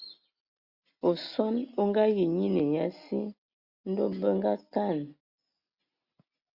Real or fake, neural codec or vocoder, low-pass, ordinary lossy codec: real; none; 5.4 kHz; Opus, 64 kbps